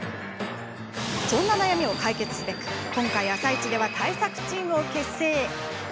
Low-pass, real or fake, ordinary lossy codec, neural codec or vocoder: none; real; none; none